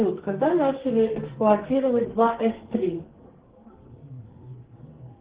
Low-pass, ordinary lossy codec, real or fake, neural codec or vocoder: 3.6 kHz; Opus, 16 kbps; fake; codec, 44.1 kHz, 2.6 kbps, SNAC